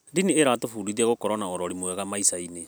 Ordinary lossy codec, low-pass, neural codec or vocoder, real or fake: none; none; none; real